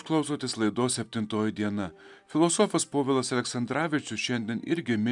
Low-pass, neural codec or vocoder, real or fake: 10.8 kHz; none; real